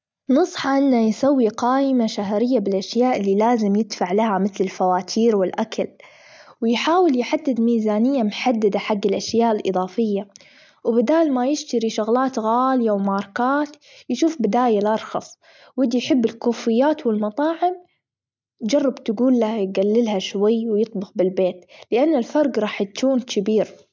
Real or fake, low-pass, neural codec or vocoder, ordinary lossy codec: real; none; none; none